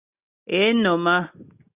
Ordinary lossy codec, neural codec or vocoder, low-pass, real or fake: Opus, 64 kbps; none; 3.6 kHz; real